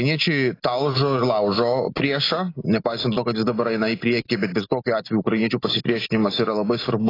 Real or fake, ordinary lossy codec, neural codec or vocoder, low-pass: real; AAC, 24 kbps; none; 5.4 kHz